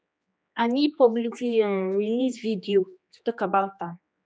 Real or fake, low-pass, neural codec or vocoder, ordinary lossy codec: fake; none; codec, 16 kHz, 2 kbps, X-Codec, HuBERT features, trained on general audio; none